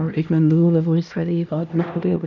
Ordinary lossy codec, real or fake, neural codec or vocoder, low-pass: none; fake; codec, 16 kHz, 1 kbps, X-Codec, HuBERT features, trained on LibriSpeech; 7.2 kHz